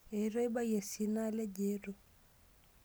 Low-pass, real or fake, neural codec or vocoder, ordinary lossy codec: none; real; none; none